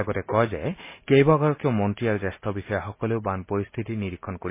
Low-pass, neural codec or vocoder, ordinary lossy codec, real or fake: 3.6 kHz; none; MP3, 24 kbps; real